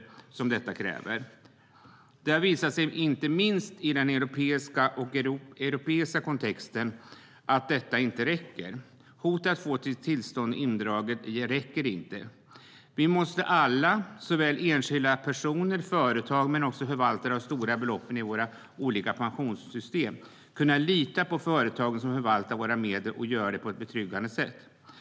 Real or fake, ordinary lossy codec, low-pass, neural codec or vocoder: real; none; none; none